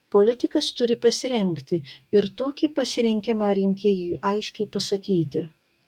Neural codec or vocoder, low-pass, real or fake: codec, 44.1 kHz, 2.6 kbps, DAC; 19.8 kHz; fake